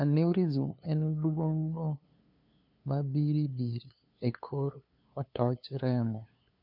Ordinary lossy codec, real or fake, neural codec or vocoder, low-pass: none; fake; codec, 16 kHz, 2 kbps, FunCodec, trained on LibriTTS, 25 frames a second; 5.4 kHz